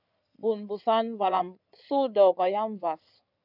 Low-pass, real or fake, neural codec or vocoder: 5.4 kHz; fake; codec, 16 kHz in and 24 kHz out, 2.2 kbps, FireRedTTS-2 codec